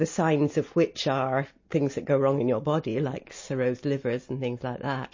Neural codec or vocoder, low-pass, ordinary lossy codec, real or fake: none; 7.2 kHz; MP3, 32 kbps; real